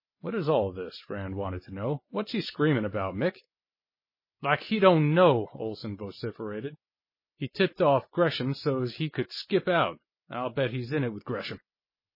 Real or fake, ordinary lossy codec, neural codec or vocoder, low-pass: real; MP3, 24 kbps; none; 5.4 kHz